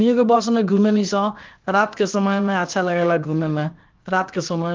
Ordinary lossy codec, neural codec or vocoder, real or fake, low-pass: Opus, 32 kbps; codec, 16 kHz, 0.7 kbps, FocalCodec; fake; 7.2 kHz